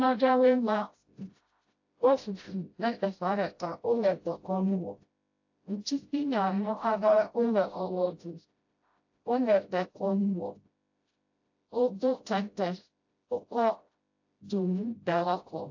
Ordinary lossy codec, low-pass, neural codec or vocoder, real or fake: AAC, 48 kbps; 7.2 kHz; codec, 16 kHz, 0.5 kbps, FreqCodec, smaller model; fake